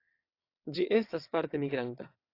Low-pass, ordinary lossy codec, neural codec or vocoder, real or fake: 5.4 kHz; AAC, 32 kbps; vocoder, 22.05 kHz, 80 mel bands, WaveNeXt; fake